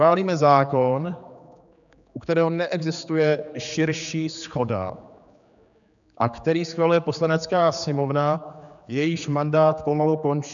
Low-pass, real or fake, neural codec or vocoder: 7.2 kHz; fake; codec, 16 kHz, 4 kbps, X-Codec, HuBERT features, trained on general audio